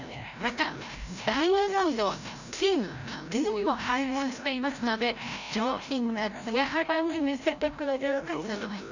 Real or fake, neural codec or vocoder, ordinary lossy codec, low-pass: fake; codec, 16 kHz, 0.5 kbps, FreqCodec, larger model; none; 7.2 kHz